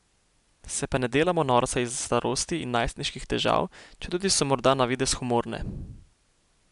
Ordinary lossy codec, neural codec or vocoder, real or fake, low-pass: none; none; real; 10.8 kHz